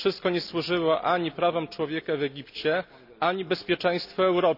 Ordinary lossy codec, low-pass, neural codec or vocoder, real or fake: none; 5.4 kHz; none; real